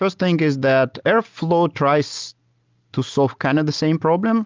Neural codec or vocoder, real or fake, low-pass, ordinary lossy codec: none; real; 7.2 kHz; Opus, 32 kbps